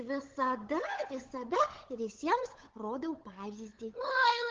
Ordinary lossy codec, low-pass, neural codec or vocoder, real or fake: Opus, 16 kbps; 7.2 kHz; codec, 16 kHz, 8 kbps, FunCodec, trained on LibriTTS, 25 frames a second; fake